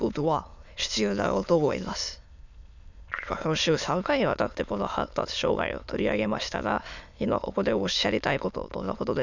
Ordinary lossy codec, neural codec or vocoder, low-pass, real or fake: none; autoencoder, 22.05 kHz, a latent of 192 numbers a frame, VITS, trained on many speakers; 7.2 kHz; fake